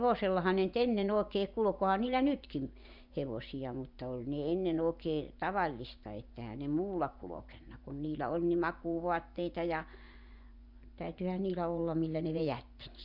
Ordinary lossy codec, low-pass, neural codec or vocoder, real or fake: none; 5.4 kHz; vocoder, 24 kHz, 100 mel bands, Vocos; fake